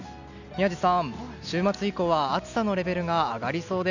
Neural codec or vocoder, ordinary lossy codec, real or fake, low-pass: none; none; real; 7.2 kHz